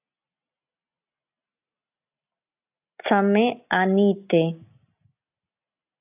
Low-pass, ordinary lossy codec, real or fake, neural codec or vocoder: 3.6 kHz; AAC, 32 kbps; real; none